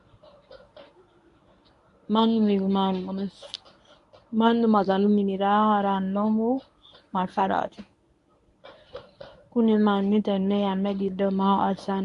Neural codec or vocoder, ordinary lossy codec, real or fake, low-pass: codec, 24 kHz, 0.9 kbps, WavTokenizer, medium speech release version 1; AAC, 64 kbps; fake; 10.8 kHz